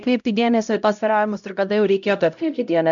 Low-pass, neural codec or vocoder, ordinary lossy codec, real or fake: 7.2 kHz; codec, 16 kHz, 0.5 kbps, X-Codec, HuBERT features, trained on LibriSpeech; MP3, 96 kbps; fake